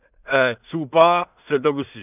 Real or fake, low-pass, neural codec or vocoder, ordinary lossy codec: fake; 3.6 kHz; codec, 16 kHz in and 24 kHz out, 0.4 kbps, LongCat-Audio-Codec, two codebook decoder; none